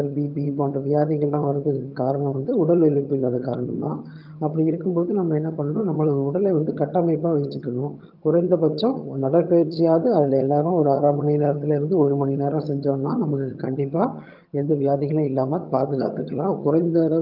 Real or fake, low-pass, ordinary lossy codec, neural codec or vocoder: fake; 5.4 kHz; Opus, 24 kbps; vocoder, 22.05 kHz, 80 mel bands, HiFi-GAN